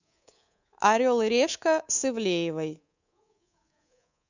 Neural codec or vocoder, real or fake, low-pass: codec, 24 kHz, 3.1 kbps, DualCodec; fake; 7.2 kHz